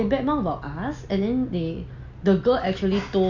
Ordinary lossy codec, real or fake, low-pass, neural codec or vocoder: none; real; 7.2 kHz; none